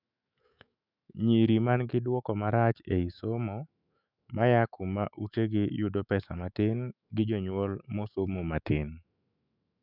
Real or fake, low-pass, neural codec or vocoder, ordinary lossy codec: fake; 5.4 kHz; autoencoder, 48 kHz, 128 numbers a frame, DAC-VAE, trained on Japanese speech; none